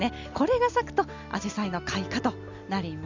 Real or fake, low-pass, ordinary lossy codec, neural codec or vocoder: real; 7.2 kHz; Opus, 64 kbps; none